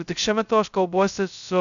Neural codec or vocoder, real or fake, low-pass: codec, 16 kHz, 0.2 kbps, FocalCodec; fake; 7.2 kHz